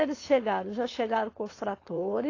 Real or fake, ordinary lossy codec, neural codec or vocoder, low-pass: fake; AAC, 32 kbps; codec, 16 kHz, 4.8 kbps, FACodec; 7.2 kHz